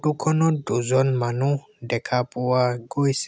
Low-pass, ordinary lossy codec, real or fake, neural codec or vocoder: none; none; real; none